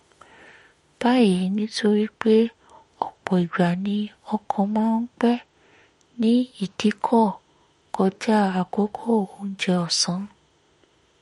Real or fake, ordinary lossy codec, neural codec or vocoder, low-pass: fake; MP3, 48 kbps; autoencoder, 48 kHz, 32 numbers a frame, DAC-VAE, trained on Japanese speech; 19.8 kHz